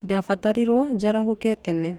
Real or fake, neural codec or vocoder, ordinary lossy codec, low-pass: fake; codec, 44.1 kHz, 2.6 kbps, DAC; none; 19.8 kHz